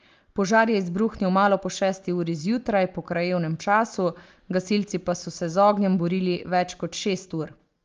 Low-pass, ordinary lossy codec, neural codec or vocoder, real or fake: 7.2 kHz; Opus, 32 kbps; none; real